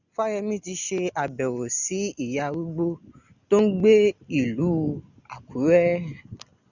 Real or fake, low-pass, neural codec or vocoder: real; 7.2 kHz; none